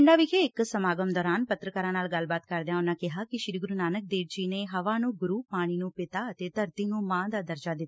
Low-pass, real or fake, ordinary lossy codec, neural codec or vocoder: none; real; none; none